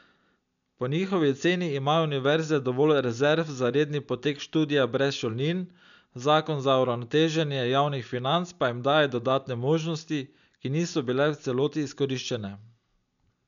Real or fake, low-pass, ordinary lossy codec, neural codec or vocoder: real; 7.2 kHz; none; none